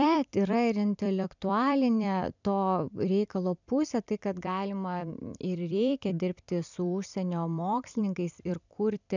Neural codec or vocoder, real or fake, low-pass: vocoder, 44.1 kHz, 128 mel bands every 256 samples, BigVGAN v2; fake; 7.2 kHz